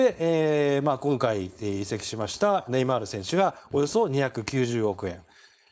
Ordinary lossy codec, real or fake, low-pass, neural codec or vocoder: none; fake; none; codec, 16 kHz, 4.8 kbps, FACodec